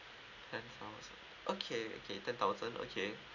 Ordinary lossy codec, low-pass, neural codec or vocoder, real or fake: none; 7.2 kHz; none; real